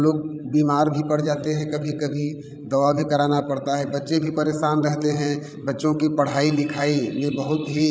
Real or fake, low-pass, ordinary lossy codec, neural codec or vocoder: fake; none; none; codec, 16 kHz, 16 kbps, FreqCodec, larger model